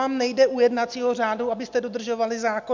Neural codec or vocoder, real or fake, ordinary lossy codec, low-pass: none; real; MP3, 64 kbps; 7.2 kHz